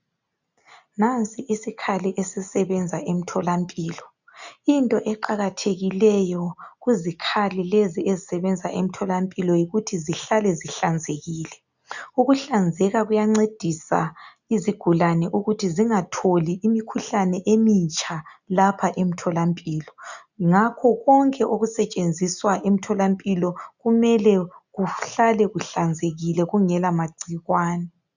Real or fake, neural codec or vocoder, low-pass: real; none; 7.2 kHz